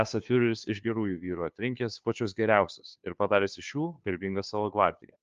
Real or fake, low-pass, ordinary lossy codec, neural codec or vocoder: fake; 7.2 kHz; Opus, 32 kbps; codec, 16 kHz, 2 kbps, FunCodec, trained on Chinese and English, 25 frames a second